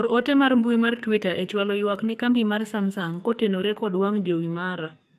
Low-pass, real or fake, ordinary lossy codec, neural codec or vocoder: 14.4 kHz; fake; AAC, 96 kbps; codec, 44.1 kHz, 2.6 kbps, SNAC